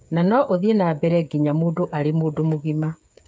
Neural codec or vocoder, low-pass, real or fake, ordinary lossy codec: codec, 16 kHz, 8 kbps, FreqCodec, smaller model; none; fake; none